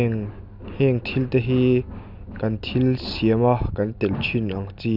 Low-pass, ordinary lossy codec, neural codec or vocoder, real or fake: 5.4 kHz; none; none; real